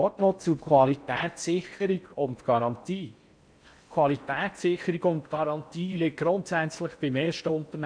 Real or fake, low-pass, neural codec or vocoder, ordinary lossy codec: fake; 9.9 kHz; codec, 16 kHz in and 24 kHz out, 0.6 kbps, FocalCodec, streaming, 4096 codes; none